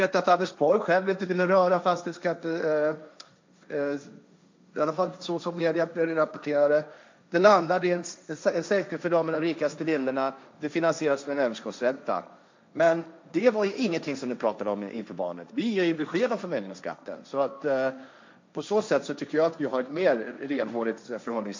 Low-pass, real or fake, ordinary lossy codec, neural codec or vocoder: 7.2 kHz; fake; MP3, 64 kbps; codec, 16 kHz, 1.1 kbps, Voila-Tokenizer